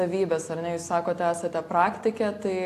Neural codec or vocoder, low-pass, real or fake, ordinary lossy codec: none; 14.4 kHz; real; AAC, 64 kbps